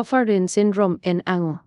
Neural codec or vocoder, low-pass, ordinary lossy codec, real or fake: codec, 24 kHz, 0.5 kbps, DualCodec; 10.8 kHz; none; fake